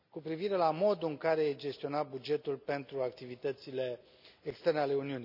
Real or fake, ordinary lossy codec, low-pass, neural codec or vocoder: real; none; 5.4 kHz; none